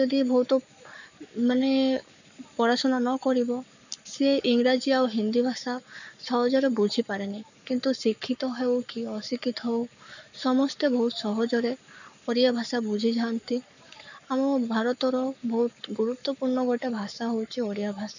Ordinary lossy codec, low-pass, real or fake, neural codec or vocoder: none; 7.2 kHz; fake; codec, 44.1 kHz, 7.8 kbps, Pupu-Codec